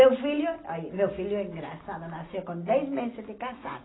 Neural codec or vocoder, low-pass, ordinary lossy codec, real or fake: none; 7.2 kHz; AAC, 16 kbps; real